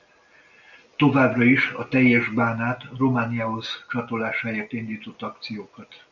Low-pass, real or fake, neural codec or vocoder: 7.2 kHz; real; none